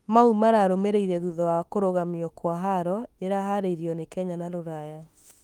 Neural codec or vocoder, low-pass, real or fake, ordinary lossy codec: autoencoder, 48 kHz, 32 numbers a frame, DAC-VAE, trained on Japanese speech; 19.8 kHz; fake; Opus, 32 kbps